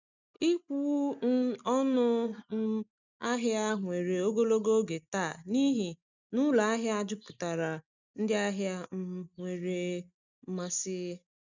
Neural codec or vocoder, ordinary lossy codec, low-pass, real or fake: none; none; 7.2 kHz; real